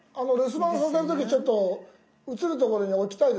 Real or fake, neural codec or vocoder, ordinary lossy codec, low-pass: real; none; none; none